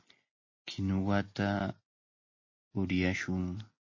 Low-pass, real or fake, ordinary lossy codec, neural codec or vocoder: 7.2 kHz; real; MP3, 32 kbps; none